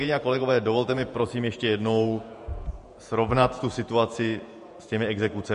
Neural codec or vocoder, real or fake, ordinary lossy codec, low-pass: vocoder, 48 kHz, 128 mel bands, Vocos; fake; MP3, 48 kbps; 14.4 kHz